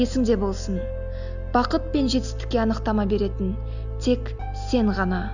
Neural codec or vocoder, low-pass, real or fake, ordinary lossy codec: none; 7.2 kHz; real; none